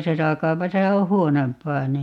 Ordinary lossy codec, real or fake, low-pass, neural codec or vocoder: MP3, 96 kbps; real; 19.8 kHz; none